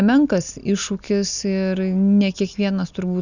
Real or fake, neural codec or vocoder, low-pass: real; none; 7.2 kHz